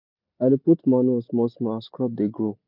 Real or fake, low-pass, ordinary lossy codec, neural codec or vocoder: real; 5.4 kHz; MP3, 48 kbps; none